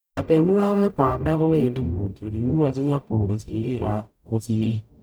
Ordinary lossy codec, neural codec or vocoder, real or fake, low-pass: none; codec, 44.1 kHz, 0.9 kbps, DAC; fake; none